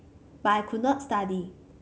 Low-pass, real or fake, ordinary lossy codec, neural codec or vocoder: none; real; none; none